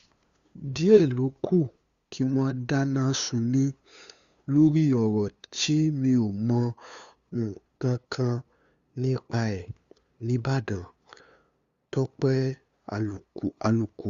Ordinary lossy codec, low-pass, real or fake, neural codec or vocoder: Opus, 64 kbps; 7.2 kHz; fake; codec, 16 kHz, 2 kbps, FunCodec, trained on LibriTTS, 25 frames a second